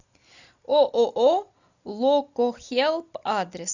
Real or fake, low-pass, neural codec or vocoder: real; 7.2 kHz; none